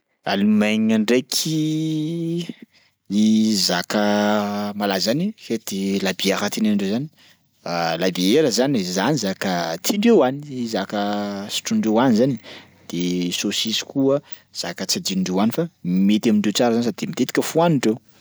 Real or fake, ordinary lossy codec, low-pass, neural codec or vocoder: real; none; none; none